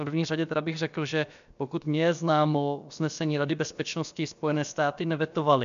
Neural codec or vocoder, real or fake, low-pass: codec, 16 kHz, about 1 kbps, DyCAST, with the encoder's durations; fake; 7.2 kHz